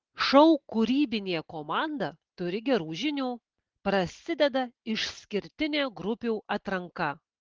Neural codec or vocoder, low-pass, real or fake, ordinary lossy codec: none; 7.2 kHz; real; Opus, 16 kbps